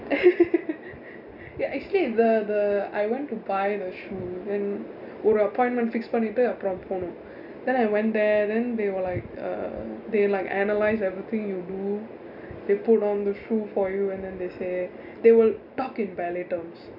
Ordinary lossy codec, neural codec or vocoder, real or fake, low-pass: none; none; real; 5.4 kHz